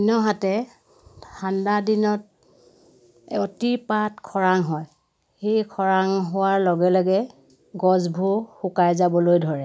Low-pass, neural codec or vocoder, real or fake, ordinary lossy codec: none; none; real; none